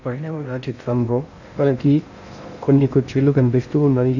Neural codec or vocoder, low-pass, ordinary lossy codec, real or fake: codec, 16 kHz in and 24 kHz out, 0.6 kbps, FocalCodec, streaming, 2048 codes; 7.2 kHz; none; fake